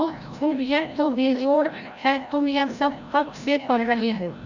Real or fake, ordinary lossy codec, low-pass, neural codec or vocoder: fake; none; 7.2 kHz; codec, 16 kHz, 0.5 kbps, FreqCodec, larger model